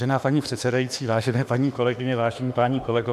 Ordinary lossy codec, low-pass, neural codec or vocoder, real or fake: AAC, 96 kbps; 14.4 kHz; autoencoder, 48 kHz, 32 numbers a frame, DAC-VAE, trained on Japanese speech; fake